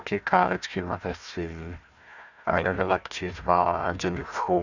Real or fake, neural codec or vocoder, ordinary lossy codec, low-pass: fake; codec, 16 kHz, 1 kbps, FunCodec, trained on Chinese and English, 50 frames a second; none; 7.2 kHz